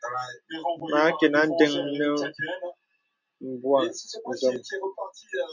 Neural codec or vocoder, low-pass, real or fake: none; 7.2 kHz; real